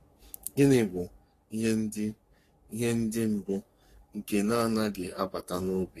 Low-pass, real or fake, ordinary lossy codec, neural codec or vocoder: 14.4 kHz; fake; AAC, 48 kbps; codec, 44.1 kHz, 3.4 kbps, Pupu-Codec